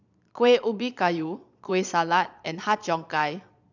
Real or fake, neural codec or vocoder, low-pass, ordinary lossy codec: fake; vocoder, 22.05 kHz, 80 mel bands, Vocos; 7.2 kHz; none